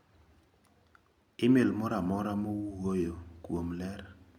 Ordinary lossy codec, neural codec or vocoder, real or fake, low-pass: none; none; real; 19.8 kHz